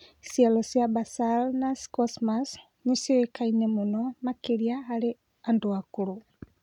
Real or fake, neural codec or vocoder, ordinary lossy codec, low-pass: real; none; none; 19.8 kHz